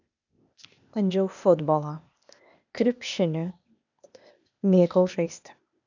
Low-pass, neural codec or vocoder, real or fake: 7.2 kHz; codec, 16 kHz, 0.8 kbps, ZipCodec; fake